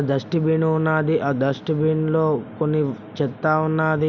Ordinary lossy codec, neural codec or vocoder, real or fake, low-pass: none; none; real; 7.2 kHz